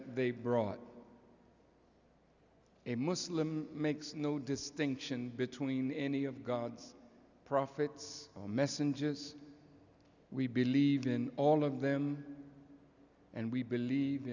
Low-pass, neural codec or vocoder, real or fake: 7.2 kHz; none; real